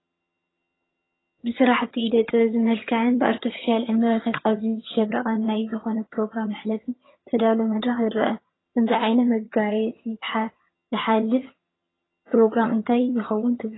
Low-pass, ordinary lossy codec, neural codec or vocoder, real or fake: 7.2 kHz; AAC, 16 kbps; vocoder, 22.05 kHz, 80 mel bands, HiFi-GAN; fake